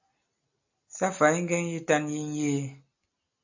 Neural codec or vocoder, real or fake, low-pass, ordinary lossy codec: none; real; 7.2 kHz; AAC, 48 kbps